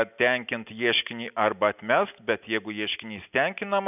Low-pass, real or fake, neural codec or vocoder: 3.6 kHz; real; none